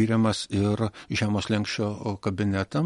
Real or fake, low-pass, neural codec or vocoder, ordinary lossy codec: real; 19.8 kHz; none; MP3, 48 kbps